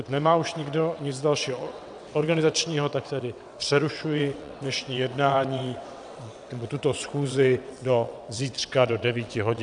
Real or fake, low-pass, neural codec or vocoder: fake; 9.9 kHz; vocoder, 22.05 kHz, 80 mel bands, WaveNeXt